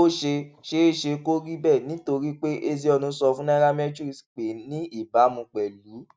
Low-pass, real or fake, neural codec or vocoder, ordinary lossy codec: none; real; none; none